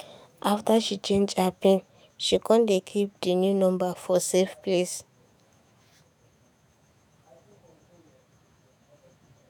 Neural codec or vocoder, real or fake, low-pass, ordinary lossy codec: autoencoder, 48 kHz, 128 numbers a frame, DAC-VAE, trained on Japanese speech; fake; none; none